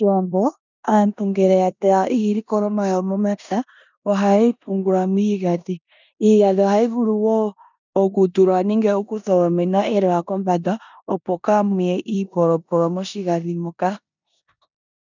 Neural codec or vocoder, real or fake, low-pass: codec, 16 kHz in and 24 kHz out, 0.9 kbps, LongCat-Audio-Codec, four codebook decoder; fake; 7.2 kHz